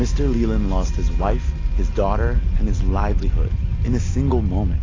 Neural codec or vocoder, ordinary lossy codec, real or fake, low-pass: none; AAC, 32 kbps; real; 7.2 kHz